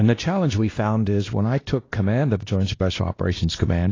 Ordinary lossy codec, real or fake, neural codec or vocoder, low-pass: AAC, 32 kbps; fake; codec, 16 kHz, 1 kbps, X-Codec, WavLM features, trained on Multilingual LibriSpeech; 7.2 kHz